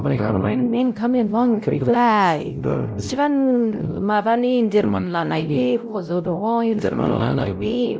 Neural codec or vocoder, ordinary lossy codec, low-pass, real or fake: codec, 16 kHz, 0.5 kbps, X-Codec, WavLM features, trained on Multilingual LibriSpeech; none; none; fake